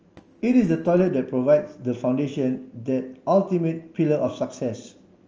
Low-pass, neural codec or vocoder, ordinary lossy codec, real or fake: 7.2 kHz; none; Opus, 24 kbps; real